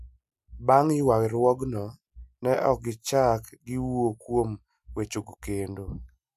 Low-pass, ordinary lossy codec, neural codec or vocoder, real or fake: 14.4 kHz; none; none; real